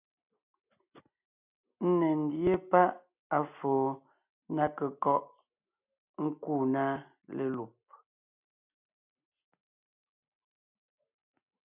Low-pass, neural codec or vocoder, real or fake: 3.6 kHz; none; real